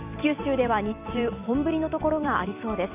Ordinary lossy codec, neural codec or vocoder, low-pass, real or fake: none; vocoder, 44.1 kHz, 128 mel bands every 256 samples, BigVGAN v2; 3.6 kHz; fake